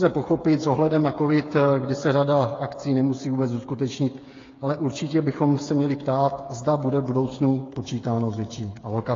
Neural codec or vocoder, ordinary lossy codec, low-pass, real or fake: codec, 16 kHz, 8 kbps, FreqCodec, smaller model; AAC, 32 kbps; 7.2 kHz; fake